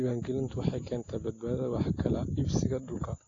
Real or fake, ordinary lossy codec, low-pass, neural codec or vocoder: real; AAC, 32 kbps; 7.2 kHz; none